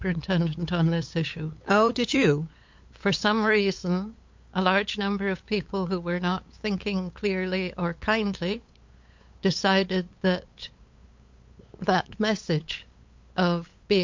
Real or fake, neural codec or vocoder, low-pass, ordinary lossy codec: fake; codec, 16 kHz, 8 kbps, FunCodec, trained on LibriTTS, 25 frames a second; 7.2 kHz; MP3, 48 kbps